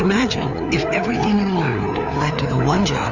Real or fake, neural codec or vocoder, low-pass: fake; codec, 16 kHz, 4 kbps, FreqCodec, larger model; 7.2 kHz